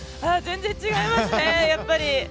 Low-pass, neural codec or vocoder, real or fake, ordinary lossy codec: none; none; real; none